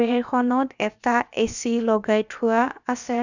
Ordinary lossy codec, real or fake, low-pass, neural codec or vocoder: none; fake; 7.2 kHz; codec, 16 kHz, about 1 kbps, DyCAST, with the encoder's durations